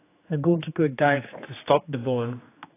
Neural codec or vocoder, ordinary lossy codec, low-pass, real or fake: codec, 16 kHz, 2 kbps, X-Codec, HuBERT features, trained on general audio; AAC, 24 kbps; 3.6 kHz; fake